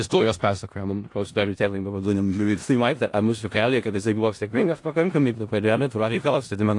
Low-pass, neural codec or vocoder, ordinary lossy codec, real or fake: 10.8 kHz; codec, 16 kHz in and 24 kHz out, 0.4 kbps, LongCat-Audio-Codec, four codebook decoder; AAC, 48 kbps; fake